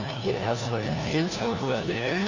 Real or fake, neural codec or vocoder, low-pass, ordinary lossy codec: fake; codec, 16 kHz, 1 kbps, FunCodec, trained on LibriTTS, 50 frames a second; 7.2 kHz; none